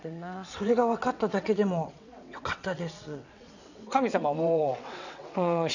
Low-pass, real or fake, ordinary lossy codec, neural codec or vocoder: 7.2 kHz; fake; none; vocoder, 22.05 kHz, 80 mel bands, WaveNeXt